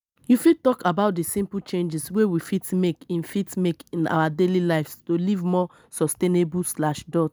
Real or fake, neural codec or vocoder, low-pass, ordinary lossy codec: real; none; none; none